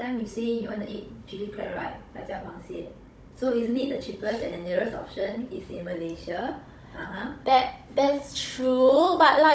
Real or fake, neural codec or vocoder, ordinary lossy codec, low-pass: fake; codec, 16 kHz, 16 kbps, FunCodec, trained on Chinese and English, 50 frames a second; none; none